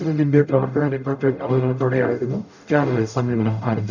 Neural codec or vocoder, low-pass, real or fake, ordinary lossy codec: codec, 44.1 kHz, 0.9 kbps, DAC; 7.2 kHz; fake; none